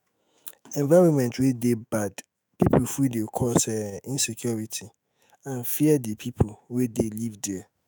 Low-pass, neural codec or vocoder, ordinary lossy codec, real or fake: none; autoencoder, 48 kHz, 128 numbers a frame, DAC-VAE, trained on Japanese speech; none; fake